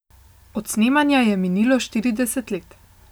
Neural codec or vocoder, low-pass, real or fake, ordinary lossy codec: none; none; real; none